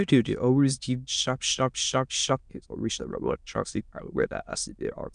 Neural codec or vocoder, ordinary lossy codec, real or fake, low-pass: autoencoder, 22.05 kHz, a latent of 192 numbers a frame, VITS, trained on many speakers; MP3, 96 kbps; fake; 9.9 kHz